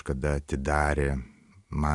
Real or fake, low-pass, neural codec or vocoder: real; 10.8 kHz; none